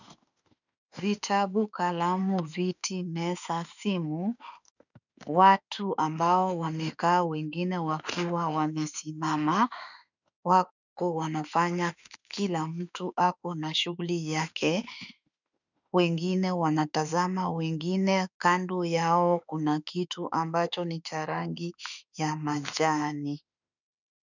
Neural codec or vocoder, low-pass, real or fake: autoencoder, 48 kHz, 32 numbers a frame, DAC-VAE, trained on Japanese speech; 7.2 kHz; fake